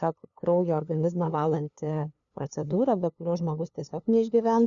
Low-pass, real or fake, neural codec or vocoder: 7.2 kHz; fake; codec, 16 kHz, 2 kbps, FunCodec, trained on LibriTTS, 25 frames a second